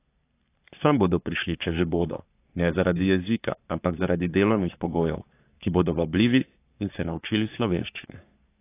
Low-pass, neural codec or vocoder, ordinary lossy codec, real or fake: 3.6 kHz; codec, 44.1 kHz, 3.4 kbps, Pupu-Codec; AAC, 24 kbps; fake